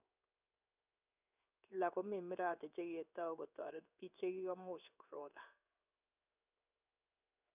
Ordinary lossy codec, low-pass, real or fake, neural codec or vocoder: none; 3.6 kHz; fake; codec, 16 kHz in and 24 kHz out, 1 kbps, XY-Tokenizer